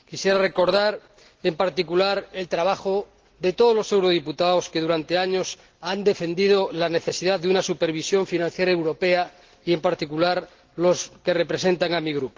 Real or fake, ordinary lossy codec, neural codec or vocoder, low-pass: real; Opus, 16 kbps; none; 7.2 kHz